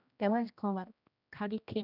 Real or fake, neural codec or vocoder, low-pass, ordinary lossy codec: fake; codec, 16 kHz, 1 kbps, X-Codec, HuBERT features, trained on general audio; 5.4 kHz; none